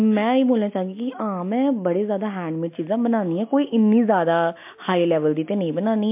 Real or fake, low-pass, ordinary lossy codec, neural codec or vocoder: real; 3.6 kHz; MP3, 32 kbps; none